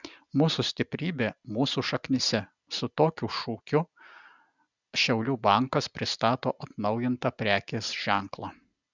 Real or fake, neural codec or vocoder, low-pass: real; none; 7.2 kHz